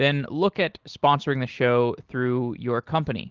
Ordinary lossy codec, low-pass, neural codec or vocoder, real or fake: Opus, 16 kbps; 7.2 kHz; none; real